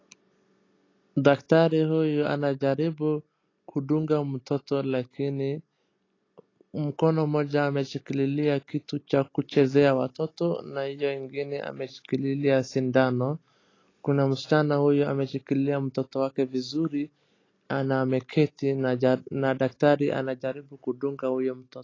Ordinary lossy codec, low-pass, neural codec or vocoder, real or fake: AAC, 32 kbps; 7.2 kHz; none; real